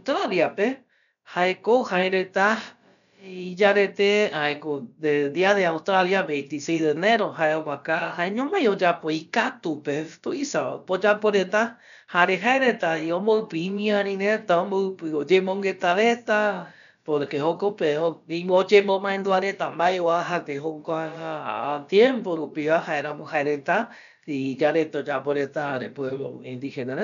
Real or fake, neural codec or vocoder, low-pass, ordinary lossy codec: fake; codec, 16 kHz, about 1 kbps, DyCAST, with the encoder's durations; 7.2 kHz; none